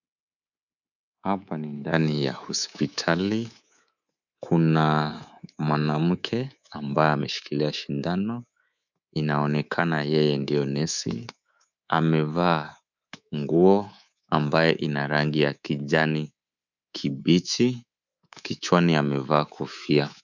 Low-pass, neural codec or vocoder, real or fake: 7.2 kHz; codec, 24 kHz, 3.1 kbps, DualCodec; fake